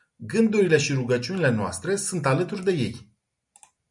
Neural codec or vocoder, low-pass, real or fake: none; 10.8 kHz; real